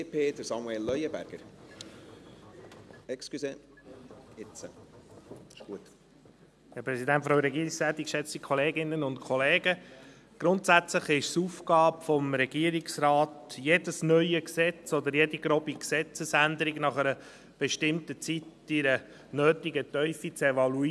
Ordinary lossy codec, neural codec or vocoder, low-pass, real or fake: none; none; none; real